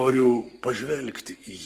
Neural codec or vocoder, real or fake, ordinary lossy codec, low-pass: codec, 44.1 kHz, 7.8 kbps, Pupu-Codec; fake; Opus, 24 kbps; 14.4 kHz